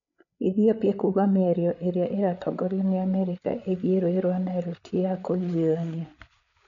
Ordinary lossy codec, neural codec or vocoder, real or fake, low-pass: none; codec, 16 kHz, 8 kbps, FreqCodec, larger model; fake; 7.2 kHz